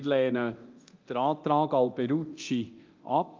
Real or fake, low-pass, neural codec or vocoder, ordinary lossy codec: fake; 7.2 kHz; codec, 24 kHz, 0.9 kbps, DualCodec; Opus, 32 kbps